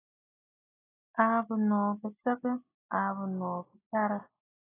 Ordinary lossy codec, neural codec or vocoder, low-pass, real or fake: AAC, 16 kbps; none; 3.6 kHz; real